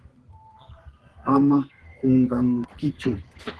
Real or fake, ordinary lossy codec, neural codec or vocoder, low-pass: fake; Opus, 16 kbps; codec, 32 kHz, 1.9 kbps, SNAC; 10.8 kHz